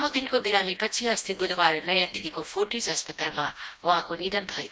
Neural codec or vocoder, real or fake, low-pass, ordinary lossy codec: codec, 16 kHz, 1 kbps, FreqCodec, smaller model; fake; none; none